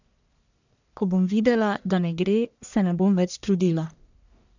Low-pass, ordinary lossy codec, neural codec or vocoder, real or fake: 7.2 kHz; none; codec, 44.1 kHz, 1.7 kbps, Pupu-Codec; fake